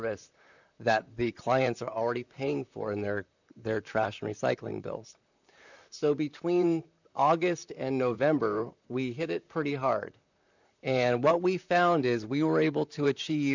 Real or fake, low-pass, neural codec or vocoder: fake; 7.2 kHz; vocoder, 44.1 kHz, 128 mel bands, Pupu-Vocoder